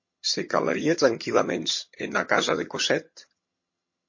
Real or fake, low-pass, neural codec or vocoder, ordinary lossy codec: fake; 7.2 kHz; vocoder, 22.05 kHz, 80 mel bands, HiFi-GAN; MP3, 32 kbps